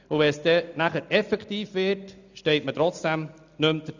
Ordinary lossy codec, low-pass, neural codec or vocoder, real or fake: none; 7.2 kHz; none; real